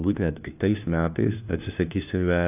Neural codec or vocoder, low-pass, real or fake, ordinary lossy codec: codec, 16 kHz, 1 kbps, FunCodec, trained on LibriTTS, 50 frames a second; 3.6 kHz; fake; AAC, 32 kbps